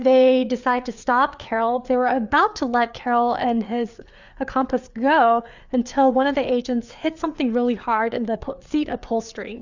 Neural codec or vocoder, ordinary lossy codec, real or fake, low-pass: codec, 16 kHz, 4 kbps, FreqCodec, larger model; Opus, 64 kbps; fake; 7.2 kHz